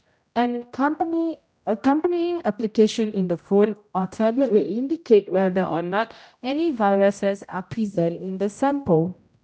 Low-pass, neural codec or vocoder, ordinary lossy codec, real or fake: none; codec, 16 kHz, 0.5 kbps, X-Codec, HuBERT features, trained on general audio; none; fake